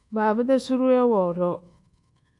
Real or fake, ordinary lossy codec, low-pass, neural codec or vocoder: fake; AAC, 64 kbps; 10.8 kHz; codec, 24 kHz, 1.2 kbps, DualCodec